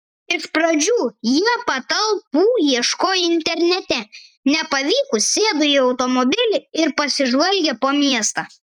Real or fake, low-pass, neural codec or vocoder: fake; 19.8 kHz; vocoder, 44.1 kHz, 128 mel bands every 256 samples, BigVGAN v2